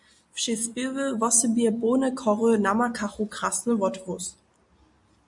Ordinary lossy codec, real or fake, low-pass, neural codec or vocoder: MP3, 64 kbps; real; 10.8 kHz; none